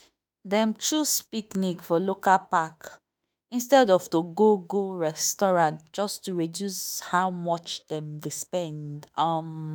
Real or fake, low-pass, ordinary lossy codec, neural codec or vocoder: fake; none; none; autoencoder, 48 kHz, 32 numbers a frame, DAC-VAE, trained on Japanese speech